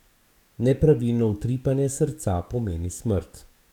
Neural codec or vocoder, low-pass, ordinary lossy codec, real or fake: codec, 44.1 kHz, 7.8 kbps, DAC; 19.8 kHz; none; fake